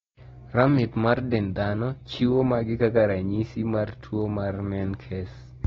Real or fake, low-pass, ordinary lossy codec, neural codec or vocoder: real; 7.2 kHz; AAC, 24 kbps; none